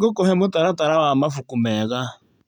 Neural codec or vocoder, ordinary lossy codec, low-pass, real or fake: none; none; 19.8 kHz; real